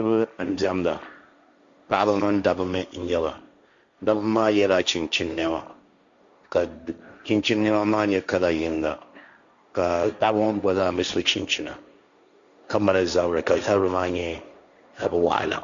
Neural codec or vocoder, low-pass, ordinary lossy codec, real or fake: codec, 16 kHz, 1.1 kbps, Voila-Tokenizer; 7.2 kHz; Opus, 64 kbps; fake